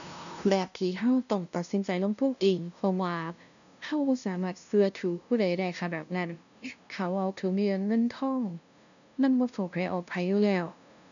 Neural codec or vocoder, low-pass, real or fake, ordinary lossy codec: codec, 16 kHz, 0.5 kbps, FunCodec, trained on LibriTTS, 25 frames a second; 7.2 kHz; fake; none